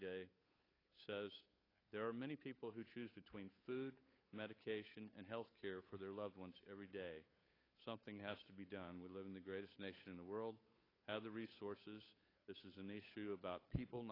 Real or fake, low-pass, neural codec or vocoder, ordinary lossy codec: fake; 5.4 kHz; codec, 16 kHz, 8 kbps, FunCodec, trained on Chinese and English, 25 frames a second; AAC, 24 kbps